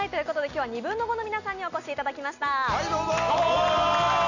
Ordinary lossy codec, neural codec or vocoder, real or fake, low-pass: none; none; real; 7.2 kHz